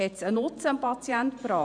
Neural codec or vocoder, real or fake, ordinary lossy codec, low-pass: vocoder, 48 kHz, 128 mel bands, Vocos; fake; none; 9.9 kHz